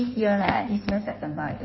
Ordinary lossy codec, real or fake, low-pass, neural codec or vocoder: MP3, 24 kbps; fake; 7.2 kHz; codec, 16 kHz in and 24 kHz out, 1.1 kbps, FireRedTTS-2 codec